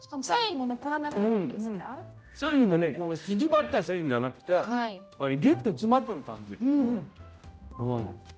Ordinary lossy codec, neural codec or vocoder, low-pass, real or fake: none; codec, 16 kHz, 0.5 kbps, X-Codec, HuBERT features, trained on general audio; none; fake